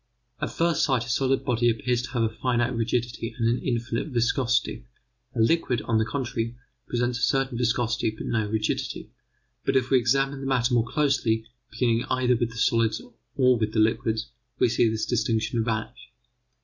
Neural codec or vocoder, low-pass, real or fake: none; 7.2 kHz; real